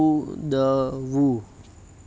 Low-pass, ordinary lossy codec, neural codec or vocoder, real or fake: none; none; none; real